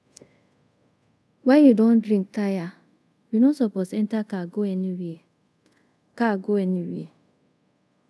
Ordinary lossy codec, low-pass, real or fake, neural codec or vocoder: none; none; fake; codec, 24 kHz, 0.5 kbps, DualCodec